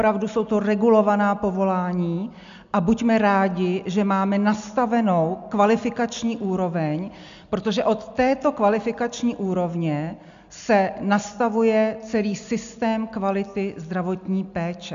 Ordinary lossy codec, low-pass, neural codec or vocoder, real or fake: MP3, 64 kbps; 7.2 kHz; none; real